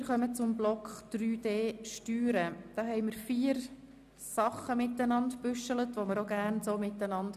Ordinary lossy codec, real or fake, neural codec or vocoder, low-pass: none; real; none; 14.4 kHz